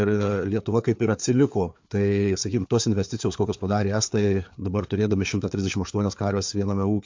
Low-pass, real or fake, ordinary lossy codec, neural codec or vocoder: 7.2 kHz; fake; MP3, 48 kbps; codec, 16 kHz, 4 kbps, FreqCodec, larger model